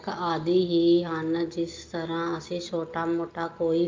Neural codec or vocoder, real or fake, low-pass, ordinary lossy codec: none; real; 7.2 kHz; Opus, 24 kbps